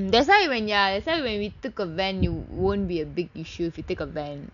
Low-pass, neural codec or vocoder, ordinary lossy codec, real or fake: 7.2 kHz; none; none; real